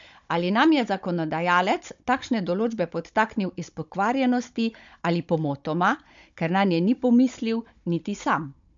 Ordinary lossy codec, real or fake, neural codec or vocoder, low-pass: MP3, 64 kbps; fake; codec, 16 kHz, 16 kbps, FunCodec, trained on Chinese and English, 50 frames a second; 7.2 kHz